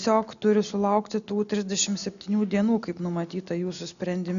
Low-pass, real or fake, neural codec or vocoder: 7.2 kHz; real; none